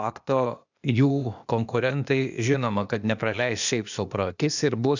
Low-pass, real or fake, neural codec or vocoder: 7.2 kHz; fake; codec, 16 kHz, 0.8 kbps, ZipCodec